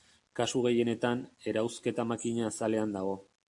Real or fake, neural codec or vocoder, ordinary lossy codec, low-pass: real; none; AAC, 64 kbps; 10.8 kHz